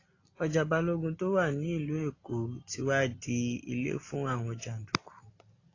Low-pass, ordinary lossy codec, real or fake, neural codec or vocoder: 7.2 kHz; AAC, 32 kbps; real; none